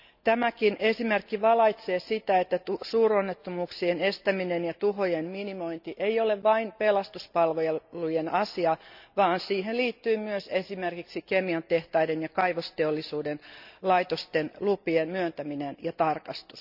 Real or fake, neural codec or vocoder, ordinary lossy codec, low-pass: real; none; none; 5.4 kHz